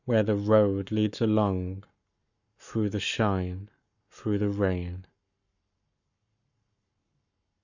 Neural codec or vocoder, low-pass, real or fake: codec, 44.1 kHz, 7.8 kbps, Pupu-Codec; 7.2 kHz; fake